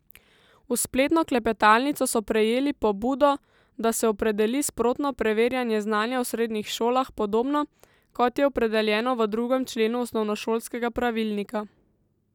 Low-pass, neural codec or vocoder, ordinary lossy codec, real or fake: 19.8 kHz; none; none; real